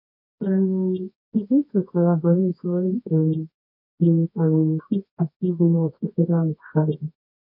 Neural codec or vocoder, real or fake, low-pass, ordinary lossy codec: codec, 24 kHz, 0.9 kbps, WavTokenizer, medium music audio release; fake; 5.4 kHz; MP3, 32 kbps